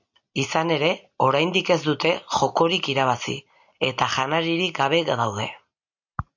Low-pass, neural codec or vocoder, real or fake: 7.2 kHz; none; real